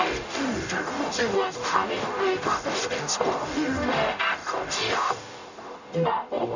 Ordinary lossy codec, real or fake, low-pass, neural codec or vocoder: none; fake; 7.2 kHz; codec, 44.1 kHz, 0.9 kbps, DAC